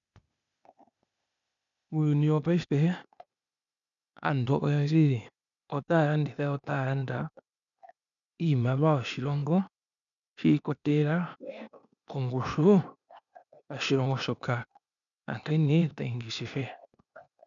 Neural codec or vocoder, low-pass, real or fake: codec, 16 kHz, 0.8 kbps, ZipCodec; 7.2 kHz; fake